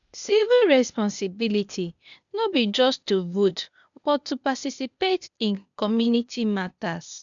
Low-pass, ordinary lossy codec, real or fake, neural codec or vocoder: 7.2 kHz; MP3, 96 kbps; fake; codec, 16 kHz, 0.8 kbps, ZipCodec